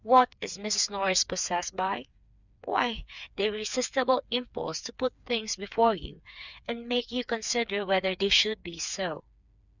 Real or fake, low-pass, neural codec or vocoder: fake; 7.2 kHz; codec, 16 kHz, 4 kbps, FreqCodec, smaller model